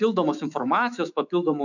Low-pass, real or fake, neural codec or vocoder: 7.2 kHz; fake; autoencoder, 48 kHz, 128 numbers a frame, DAC-VAE, trained on Japanese speech